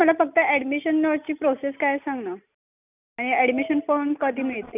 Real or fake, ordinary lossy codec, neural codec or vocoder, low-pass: real; none; none; 3.6 kHz